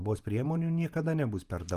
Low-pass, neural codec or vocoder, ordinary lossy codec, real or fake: 14.4 kHz; vocoder, 48 kHz, 128 mel bands, Vocos; Opus, 32 kbps; fake